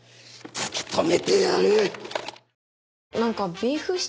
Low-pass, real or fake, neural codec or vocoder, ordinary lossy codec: none; real; none; none